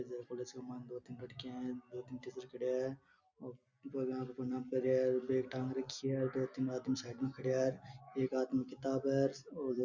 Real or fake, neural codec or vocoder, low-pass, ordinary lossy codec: real; none; 7.2 kHz; none